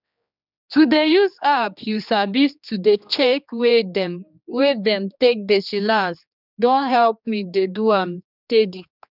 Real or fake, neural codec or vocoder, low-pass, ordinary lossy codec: fake; codec, 16 kHz, 2 kbps, X-Codec, HuBERT features, trained on general audio; 5.4 kHz; none